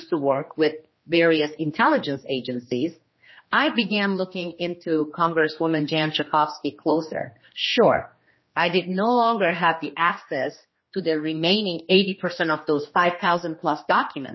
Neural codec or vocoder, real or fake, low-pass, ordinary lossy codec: codec, 16 kHz, 2 kbps, X-Codec, HuBERT features, trained on general audio; fake; 7.2 kHz; MP3, 24 kbps